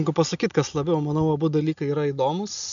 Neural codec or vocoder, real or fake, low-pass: none; real; 7.2 kHz